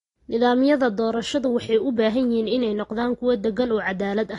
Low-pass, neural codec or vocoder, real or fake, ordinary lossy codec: 10.8 kHz; none; real; AAC, 32 kbps